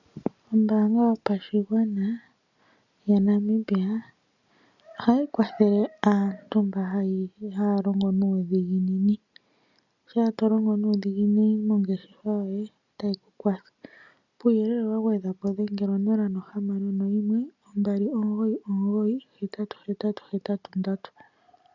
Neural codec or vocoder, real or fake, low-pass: none; real; 7.2 kHz